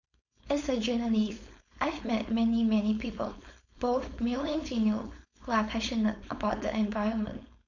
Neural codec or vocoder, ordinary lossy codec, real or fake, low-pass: codec, 16 kHz, 4.8 kbps, FACodec; none; fake; 7.2 kHz